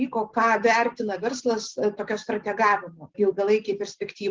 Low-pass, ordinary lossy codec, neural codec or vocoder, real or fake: 7.2 kHz; Opus, 16 kbps; none; real